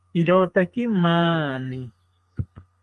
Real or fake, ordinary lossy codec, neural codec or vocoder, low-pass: fake; Opus, 32 kbps; codec, 32 kHz, 1.9 kbps, SNAC; 10.8 kHz